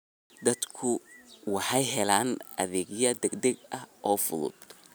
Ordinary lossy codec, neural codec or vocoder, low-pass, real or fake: none; none; none; real